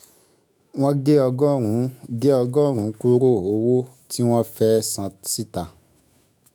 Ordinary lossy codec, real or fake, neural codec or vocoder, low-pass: none; fake; autoencoder, 48 kHz, 128 numbers a frame, DAC-VAE, trained on Japanese speech; none